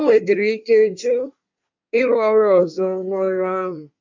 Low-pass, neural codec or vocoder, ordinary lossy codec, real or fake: 7.2 kHz; codec, 24 kHz, 1 kbps, SNAC; MP3, 64 kbps; fake